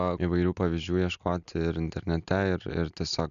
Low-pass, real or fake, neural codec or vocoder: 7.2 kHz; real; none